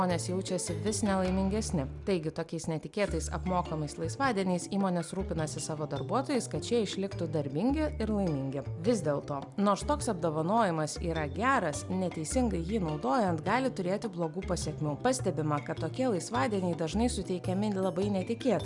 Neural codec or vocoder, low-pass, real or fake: none; 10.8 kHz; real